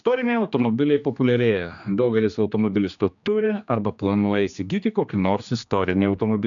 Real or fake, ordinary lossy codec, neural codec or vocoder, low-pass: fake; MP3, 96 kbps; codec, 16 kHz, 2 kbps, X-Codec, HuBERT features, trained on general audio; 7.2 kHz